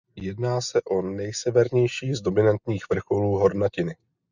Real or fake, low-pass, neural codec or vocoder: real; 7.2 kHz; none